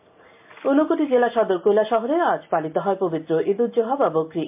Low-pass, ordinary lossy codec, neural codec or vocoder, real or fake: 3.6 kHz; MP3, 32 kbps; none; real